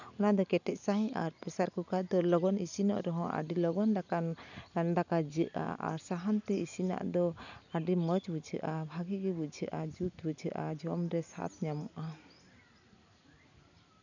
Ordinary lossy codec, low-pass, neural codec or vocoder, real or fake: none; 7.2 kHz; none; real